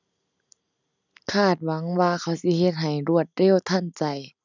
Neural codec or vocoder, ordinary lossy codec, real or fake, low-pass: none; none; real; 7.2 kHz